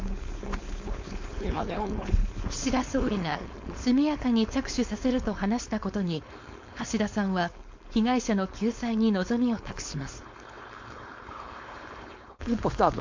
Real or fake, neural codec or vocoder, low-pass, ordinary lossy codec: fake; codec, 16 kHz, 4.8 kbps, FACodec; 7.2 kHz; MP3, 48 kbps